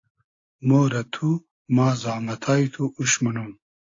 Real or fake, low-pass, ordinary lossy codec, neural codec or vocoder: real; 7.2 kHz; AAC, 32 kbps; none